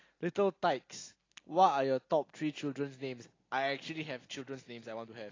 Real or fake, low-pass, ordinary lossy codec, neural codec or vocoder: real; 7.2 kHz; AAC, 32 kbps; none